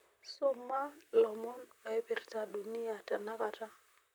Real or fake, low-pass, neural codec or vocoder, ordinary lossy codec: fake; none; vocoder, 44.1 kHz, 128 mel bands, Pupu-Vocoder; none